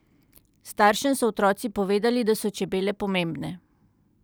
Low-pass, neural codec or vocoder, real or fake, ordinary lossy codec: none; none; real; none